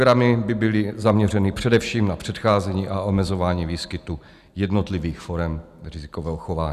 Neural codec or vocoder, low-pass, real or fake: none; 14.4 kHz; real